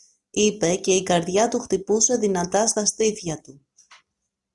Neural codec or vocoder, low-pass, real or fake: none; 10.8 kHz; real